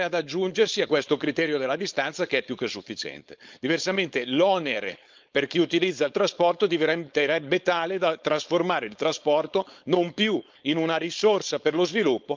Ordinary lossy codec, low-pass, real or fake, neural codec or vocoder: Opus, 24 kbps; 7.2 kHz; fake; codec, 16 kHz, 4.8 kbps, FACodec